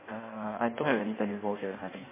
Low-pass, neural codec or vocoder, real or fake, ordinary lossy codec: 3.6 kHz; codec, 16 kHz in and 24 kHz out, 1.1 kbps, FireRedTTS-2 codec; fake; MP3, 16 kbps